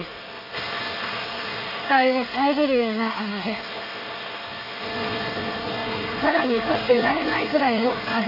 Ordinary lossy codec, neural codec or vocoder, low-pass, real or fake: none; codec, 24 kHz, 1 kbps, SNAC; 5.4 kHz; fake